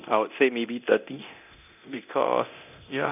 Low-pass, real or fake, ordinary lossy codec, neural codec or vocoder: 3.6 kHz; fake; none; codec, 24 kHz, 0.9 kbps, DualCodec